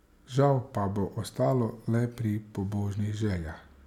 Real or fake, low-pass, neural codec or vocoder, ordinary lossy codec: real; 19.8 kHz; none; none